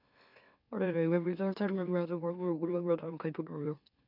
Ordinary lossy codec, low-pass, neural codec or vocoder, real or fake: none; 5.4 kHz; autoencoder, 44.1 kHz, a latent of 192 numbers a frame, MeloTTS; fake